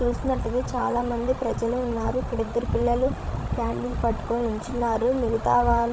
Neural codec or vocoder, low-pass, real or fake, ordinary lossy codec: codec, 16 kHz, 16 kbps, FreqCodec, larger model; none; fake; none